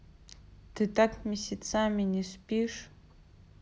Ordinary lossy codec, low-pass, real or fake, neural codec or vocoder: none; none; real; none